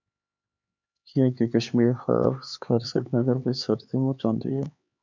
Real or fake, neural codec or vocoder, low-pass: fake; codec, 16 kHz, 4 kbps, X-Codec, HuBERT features, trained on LibriSpeech; 7.2 kHz